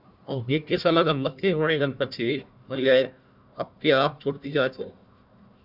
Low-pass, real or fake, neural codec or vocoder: 5.4 kHz; fake; codec, 16 kHz, 1 kbps, FunCodec, trained on Chinese and English, 50 frames a second